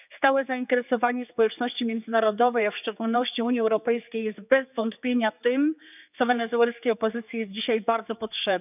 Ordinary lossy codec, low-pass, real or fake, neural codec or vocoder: none; 3.6 kHz; fake; codec, 16 kHz, 4 kbps, X-Codec, HuBERT features, trained on general audio